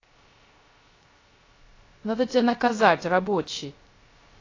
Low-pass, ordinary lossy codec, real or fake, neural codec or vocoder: 7.2 kHz; AAC, 32 kbps; fake; codec, 16 kHz, 0.3 kbps, FocalCodec